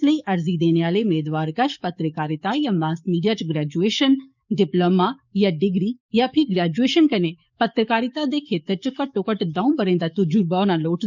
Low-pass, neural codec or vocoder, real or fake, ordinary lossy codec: 7.2 kHz; codec, 44.1 kHz, 7.8 kbps, DAC; fake; none